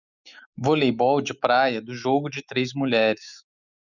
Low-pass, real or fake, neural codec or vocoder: 7.2 kHz; fake; autoencoder, 48 kHz, 128 numbers a frame, DAC-VAE, trained on Japanese speech